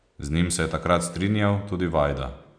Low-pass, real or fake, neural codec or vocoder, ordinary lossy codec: 9.9 kHz; real; none; none